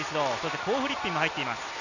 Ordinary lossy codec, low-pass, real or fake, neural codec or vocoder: none; 7.2 kHz; real; none